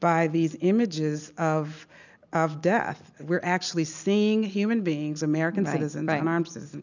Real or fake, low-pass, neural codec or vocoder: real; 7.2 kHz; none